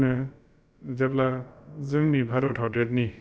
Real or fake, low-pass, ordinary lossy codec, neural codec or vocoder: fake; none; none; codec, 16 kHz, about 1 kbps, DyCAST, with the encoder's durations